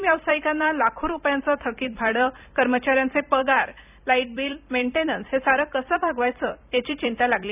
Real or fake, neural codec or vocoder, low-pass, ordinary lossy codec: fake; vocoder, 44.1 kHz, 128 mel bands every 256 samples, BigVGAN v2; 3.6 kHz; none